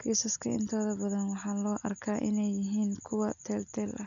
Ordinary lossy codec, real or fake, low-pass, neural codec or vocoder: none; real; 7.2 kHz; none